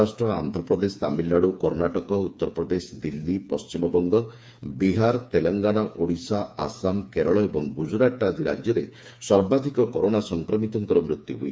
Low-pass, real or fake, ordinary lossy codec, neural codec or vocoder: none; fake; none; codec, 16 kHz, 4 kbps, FreqCodec, smaller model